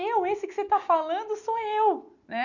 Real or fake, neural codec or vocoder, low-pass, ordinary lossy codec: real; none; 7.2 kHz; none